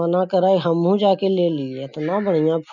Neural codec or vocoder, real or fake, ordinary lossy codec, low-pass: none; real; none; 7.2 kHz